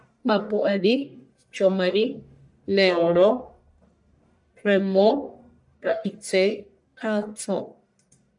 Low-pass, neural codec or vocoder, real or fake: 10.8 kHz; codec, 44.1 kHz, 1.7 kbps, Pupu-Codec; fake